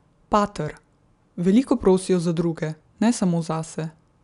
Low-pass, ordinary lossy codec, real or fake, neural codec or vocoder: 10.8 kHz; none; real; none